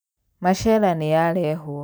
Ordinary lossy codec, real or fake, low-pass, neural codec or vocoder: none; real; none; none